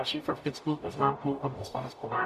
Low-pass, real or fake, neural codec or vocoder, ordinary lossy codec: 14.4 kHz; fake; codec, 44.1 kHz, 0.9 kbps, DAC; MP3, 96 kbps